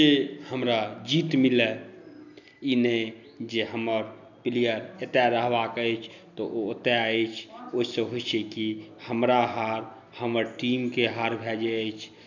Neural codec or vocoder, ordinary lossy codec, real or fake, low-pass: none; none; real; 7.2 kHz